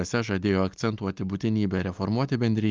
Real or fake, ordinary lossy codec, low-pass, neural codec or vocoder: real; Opus, 24 kbps; 7.2 kHz; none